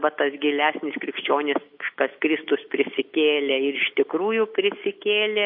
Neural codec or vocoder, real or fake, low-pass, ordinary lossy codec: none; real; 5.4 kHz; MP3, 32 kbps